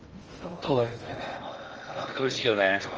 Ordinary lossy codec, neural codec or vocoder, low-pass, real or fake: Opus, 24 kbps; codec, 16 kHz in and 24 kHz out, 0.6 kbps, FocalCodec, streaming, 2048 codes; 7.2 kHz; fake